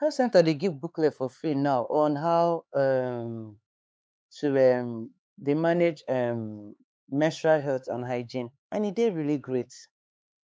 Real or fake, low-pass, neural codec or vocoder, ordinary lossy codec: fake; none; codec, 16 kHz, 4 kbps, X-Codec, HuBERT features, trained on LibriSpeech; none